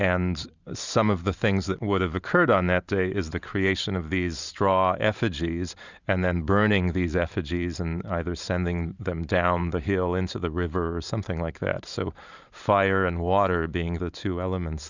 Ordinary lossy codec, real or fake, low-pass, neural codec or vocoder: Opus, 64 kbps; fake; 7.2 kHz; vocoder, 44.1 kHz, 128 mel bands every 512 samples, BigVGAN v2